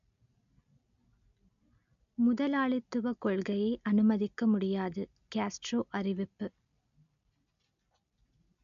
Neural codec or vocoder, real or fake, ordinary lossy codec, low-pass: none; real; AAC, 96 kbps; 7.2 kHz